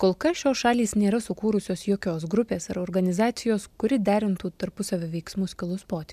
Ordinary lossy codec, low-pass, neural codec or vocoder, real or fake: MP3, 96 kbps; 14.4 kHz; none; real